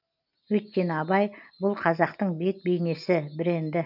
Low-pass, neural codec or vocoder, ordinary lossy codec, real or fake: 5.4 kHz; none; none; real